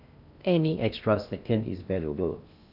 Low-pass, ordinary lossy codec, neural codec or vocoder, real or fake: 5.4 kHz; none; codec, 16 kHz in and 24 kHz out, 0.6 kbps, FocalCodec, streaming, 2048 codes; fake